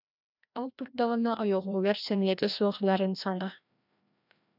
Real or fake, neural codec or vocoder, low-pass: fake; codec, 16 kHz, 1 kbps, FreqCodec, larger model; 5.4 kHz